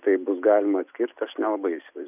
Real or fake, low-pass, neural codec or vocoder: real; 3.6 kHz; none